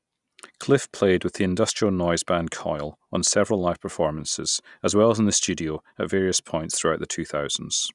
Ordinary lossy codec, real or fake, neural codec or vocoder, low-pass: none; real; none; 10.8 kHz